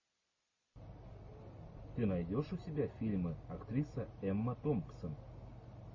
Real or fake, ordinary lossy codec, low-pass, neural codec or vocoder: real; MP3, 32 kbps; 7.2 kHz; none